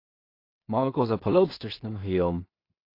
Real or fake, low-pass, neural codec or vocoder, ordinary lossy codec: fake; 5.4 kHz; codec, 16 kHz in and 24 kHz out, 0.4 kbps, LongCat-Audio-Codec, two codebook decoder; AAC, 32 kbps